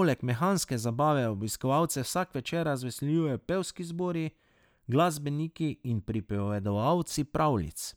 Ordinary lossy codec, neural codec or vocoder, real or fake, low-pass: none; none; real; none